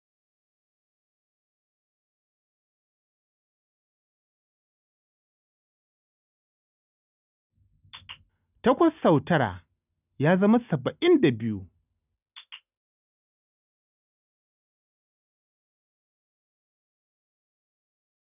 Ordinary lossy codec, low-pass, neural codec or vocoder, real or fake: none; 3.6 kHz; none; real